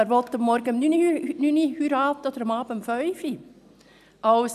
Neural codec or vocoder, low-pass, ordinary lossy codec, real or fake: none; 14.4 kHz; none; real